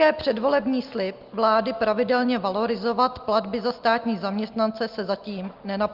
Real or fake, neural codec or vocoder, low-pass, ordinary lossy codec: real; none; 5.4 kHz; Opus, 32 kbps